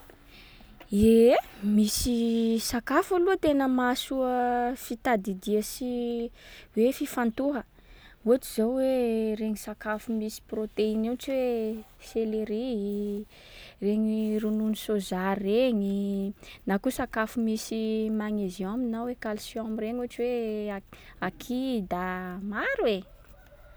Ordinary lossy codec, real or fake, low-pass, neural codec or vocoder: none; real; none; none